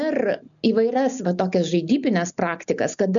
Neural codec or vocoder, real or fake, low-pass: none; real; 7.2 kHz